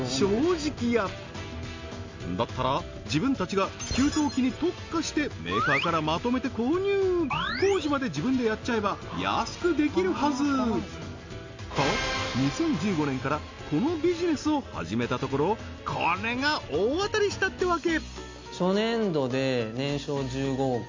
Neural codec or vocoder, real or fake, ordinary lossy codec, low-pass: none; real; MP3, 64 kbps; 7.2 kHz